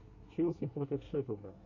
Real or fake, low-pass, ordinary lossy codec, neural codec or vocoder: fake; 7.2 kHz; AAC, 48 kbps; codec, 24 kHz, 1 kbps, SNAC